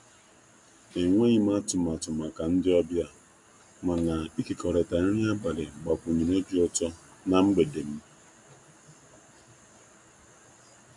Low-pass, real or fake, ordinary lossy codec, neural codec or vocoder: 10.8 kHz; real; MP3, 64 kbps; none